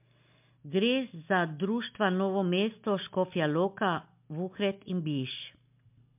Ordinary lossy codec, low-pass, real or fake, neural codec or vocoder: MP3, 32 kbps; 3.6 kHz; real; none